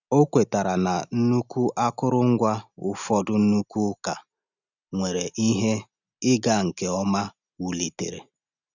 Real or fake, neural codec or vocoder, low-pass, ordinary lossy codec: real; none; 7.2 kHz; none